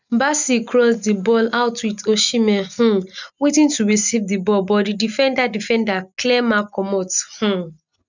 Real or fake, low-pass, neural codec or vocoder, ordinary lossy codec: real; 7.2 kHz; none; none